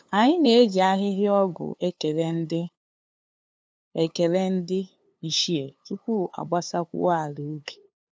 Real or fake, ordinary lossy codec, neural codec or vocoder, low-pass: fake; none; codec, 16 kHz, 2 kbps, FunCodec, trained on LibriTTS, 25 frames a second; none